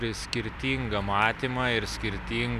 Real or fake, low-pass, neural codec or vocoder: real; 14.4 kHz; none